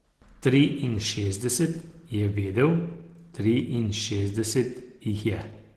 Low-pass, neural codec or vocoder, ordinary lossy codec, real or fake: 14.4 kHz; none; Opus, 16 kbps; real